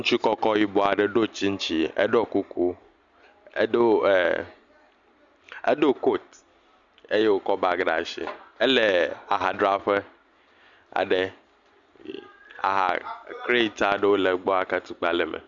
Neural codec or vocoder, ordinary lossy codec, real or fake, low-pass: none; Opus, 64 kbps; real; 7.2 kHz